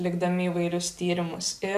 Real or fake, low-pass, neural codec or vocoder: real; 14.4 kHz; none